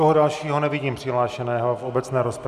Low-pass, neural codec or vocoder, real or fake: 14.4 kHz; none; real